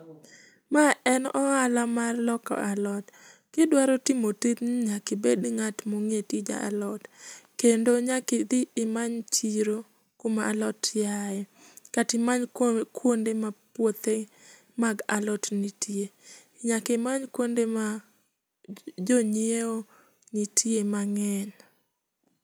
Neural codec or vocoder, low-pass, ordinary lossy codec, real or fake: none; none; none; real